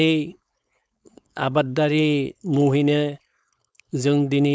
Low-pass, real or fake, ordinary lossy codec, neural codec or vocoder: none; fake; none; codec, 16 kHz, 4.8 kbps, FACodec